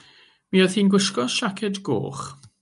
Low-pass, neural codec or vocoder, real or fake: 10.8 kHz; none; real